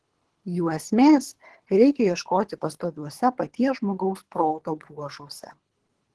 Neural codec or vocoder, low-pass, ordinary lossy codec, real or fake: codec, 24 kHz, 3 kbps, HILCodec; 10.8 kHz; Opus, 16 kbps; fake